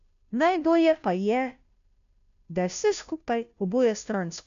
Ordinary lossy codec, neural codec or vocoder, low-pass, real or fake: none; codec, 16 kHz, 0.5 kbps, FunCodec, trained on Chinese and English, 25 frames a second; 7.2 kHz; fake